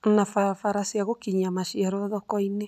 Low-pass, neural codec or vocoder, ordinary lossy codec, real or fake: 14.4 kHz; none; none; real